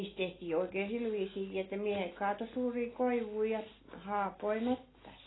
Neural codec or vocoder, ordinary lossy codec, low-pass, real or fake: none; AAC, 16 kbps; 7.2 kHz; real